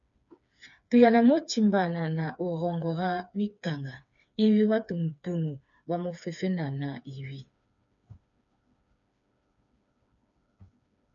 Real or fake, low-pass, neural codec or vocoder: fake; 7.2 kHz; codec, 16 kHz, 4 kbps, FreqCodec, smaller model